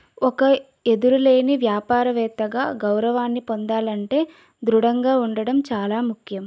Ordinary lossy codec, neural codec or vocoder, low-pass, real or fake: none; none; none; real